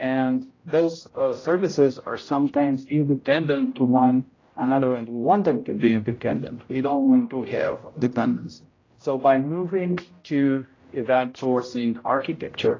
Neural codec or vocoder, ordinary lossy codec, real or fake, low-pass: codec, 16 kHz, 0.5 kbps, X-Codec, HuBERT features, trained on general audio; AAC, 32 kbps; fake; 7.2 kHz